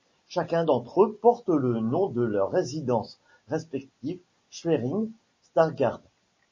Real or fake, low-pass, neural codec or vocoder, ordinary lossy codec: real; 7.2 kHz; none; MP3, 32 kbps